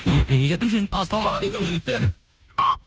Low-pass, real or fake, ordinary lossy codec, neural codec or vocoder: none; fake; none; codec, 16 kHz, 0.5 kbps, FunCodec, trained on Chinese and English, 25 frames a second